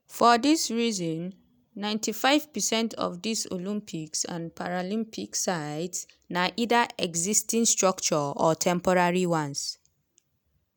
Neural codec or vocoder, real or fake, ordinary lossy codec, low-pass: none; real; none; none